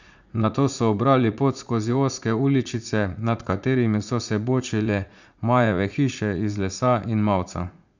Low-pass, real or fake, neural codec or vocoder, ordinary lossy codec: 7.2 kHz; fake; vocoder, 44.1 kHz, 80 mel bands, Vocos; none